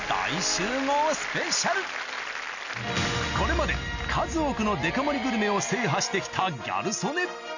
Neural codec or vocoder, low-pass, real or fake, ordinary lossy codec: none; 7.2 kHz; real; none